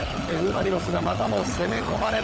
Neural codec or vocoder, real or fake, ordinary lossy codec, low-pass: codec, 16 kHz, 16 kbps, FunCodec, trained on LibriTTS, 50 frames a second; fake; none; none